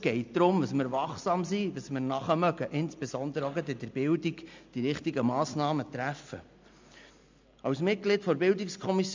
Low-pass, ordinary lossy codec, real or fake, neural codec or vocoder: 7.2 kHz; MP3, 64 kbps; real; none